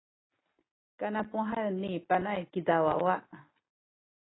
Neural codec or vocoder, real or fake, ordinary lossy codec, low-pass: none; real; AAC, 16 kbps; 7.2 kHz